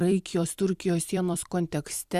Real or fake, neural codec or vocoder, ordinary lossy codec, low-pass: fake; vocoder, 44.1 kHz, 128 mel bands every 256 samples, BigVGAN v2; Opus, 64 kbps; 14.4 kHz